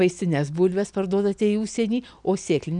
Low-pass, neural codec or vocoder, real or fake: 9.9 kHz; none; real